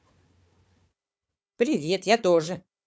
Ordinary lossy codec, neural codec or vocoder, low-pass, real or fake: none; codec, 16 kHz, 4 kbps, FunCodec, trained on Chinese and English, 50 frames a second; none; fake